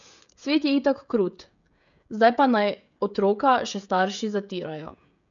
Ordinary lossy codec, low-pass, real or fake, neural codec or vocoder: none; 7.2 kHz; fake; codec, 16 kHz, 16 kbps, FreqCodec, smaller model